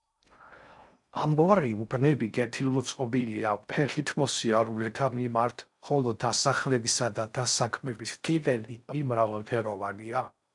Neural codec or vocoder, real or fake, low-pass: codec, 16 kHz in and 24 kHz out, 0.6 kbps, FocalCodec, streaming, 4096 codes; fake; 10.8 kHz